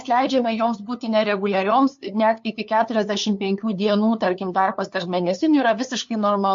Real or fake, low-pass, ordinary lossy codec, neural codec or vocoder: fake; 7.2 kHz; MP3, 48 kbps; codec, 16 kHz, 2 kbps, FunCodec, trained on LibriTTS, 25 frames a second